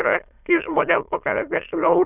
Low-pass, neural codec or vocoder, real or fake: 3.6 kHz; autoencoder, 22.05 kHz, a latent of 192 numbers a frame, VITS, trained on many speakers; fake